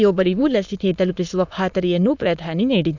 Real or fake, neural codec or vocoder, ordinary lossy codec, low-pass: fake; autoencoder, 22.05 kHz, a latent of 192 numbers a frame, VITS, trained on many speakers; none; 7.2 kHz